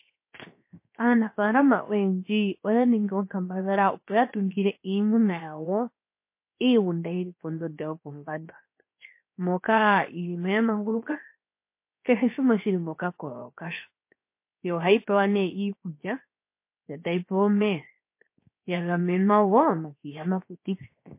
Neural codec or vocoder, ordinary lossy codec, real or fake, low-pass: codec, 16 kHz, 0.7 kbps, FocalCodec; MP3, 24 kbps; fake; 3.6 kHz